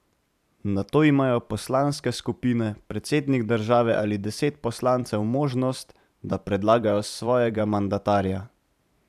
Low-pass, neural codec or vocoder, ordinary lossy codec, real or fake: 14.4 kHz; vocoder, 44.1 kHz, 128 mel bands every 512 samples, BigVGAN v2; none; fake